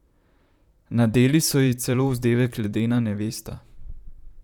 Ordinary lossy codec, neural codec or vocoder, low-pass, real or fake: none; vocoder, 44.1 kHz, 128 mel bands, Pupu-Vocoder; 19.8 kHz; fake